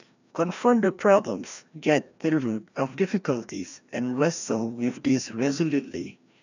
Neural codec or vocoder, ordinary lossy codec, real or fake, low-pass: codec, 16 kHz, 1 kbps, FreqCodec, larger model; none; fake; 7.2 kHz